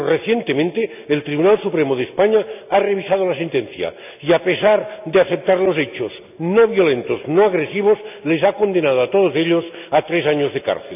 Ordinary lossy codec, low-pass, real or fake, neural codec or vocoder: none; 3.6 kHz; real; none